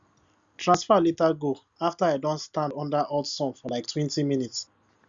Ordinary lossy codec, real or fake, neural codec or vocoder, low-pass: Opus, 64 kbps; real; none; 7.2 kHz